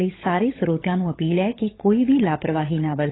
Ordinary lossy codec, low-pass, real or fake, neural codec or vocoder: AAC, 16 kbps; 7.2 kHz; fake; codec, 16 kHz, 16 kbps, FunCodec, trained on LibriTTS, 50 frames a second